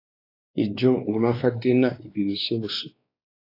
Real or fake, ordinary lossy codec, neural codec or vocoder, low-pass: fake; AAC, 32 kbps; codec, 16 kHz, 2 kbps, X-Codec, WavLM features, trained on Multilingual LibriSpeech; 5.4 kHz